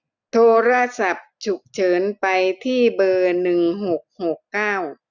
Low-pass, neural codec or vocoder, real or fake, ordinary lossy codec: 7.2 kHz; none; real; none